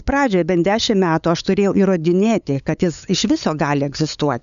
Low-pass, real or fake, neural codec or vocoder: 7.2 kHz; fake; codec, 16 kHz, 8 kbps, FunCodec, trained on LibriTTS, 25 frames a second